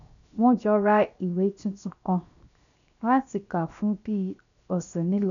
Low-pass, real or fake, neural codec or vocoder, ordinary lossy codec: 7.2 kHz; fake; codec, 16 kHz, 0.7 kbps, FocalCodec; none